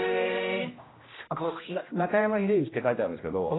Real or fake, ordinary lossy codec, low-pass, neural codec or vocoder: fake; AAC, 16 kbps; 7.2 kHz; codec, 16 kHz, 1 kbps, X-Codec, HuBERT features, trained on general audio